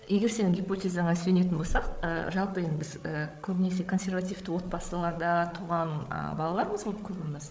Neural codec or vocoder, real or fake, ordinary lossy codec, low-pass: codec, 16 kHz, 8 kbps, FreqCodec, larger model; fake; none; none